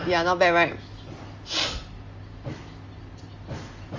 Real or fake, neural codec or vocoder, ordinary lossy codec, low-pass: real; none; Opus, 32 kbps; 7.2 kHz